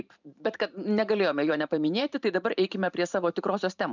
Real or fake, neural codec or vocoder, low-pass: fake; vocoder, 44.1 kHz, 128 mel bands every 512 samples, BigVGAN v2; 7.2 kHz